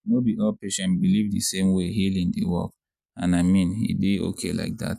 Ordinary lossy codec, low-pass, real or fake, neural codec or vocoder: none; 14.4 kHz; real; none